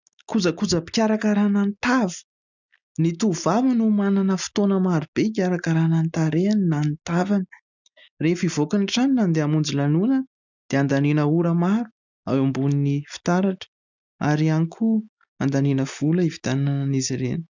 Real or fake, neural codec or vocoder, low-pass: real; none; 7.2 kHz